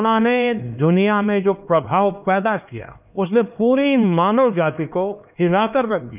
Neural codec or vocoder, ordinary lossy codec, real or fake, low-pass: codec, 16 kHz, 2 kbps, X-Codec, HuBERT features, trained on LibriSpeech; none; fake; 3.6 kHz